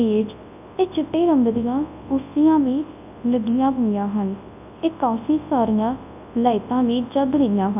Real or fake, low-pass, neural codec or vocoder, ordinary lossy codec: fake; 3.6 kHz; codec, 24 kHz, 0.9 kbps, WavTokenizer, large speech release; AAC, 32 kbps